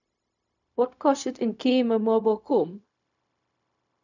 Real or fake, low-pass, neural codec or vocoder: fake; 7.2 kHz; codec, 16 kHz, 0.4 kbps, LongCat-Audio-Codec